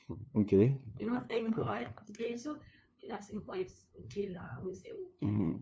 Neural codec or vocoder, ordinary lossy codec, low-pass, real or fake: codec, 16 kHz, 2 kbps, FunCodec, trained on LibriTTS, 25 frames a second; none; none; fake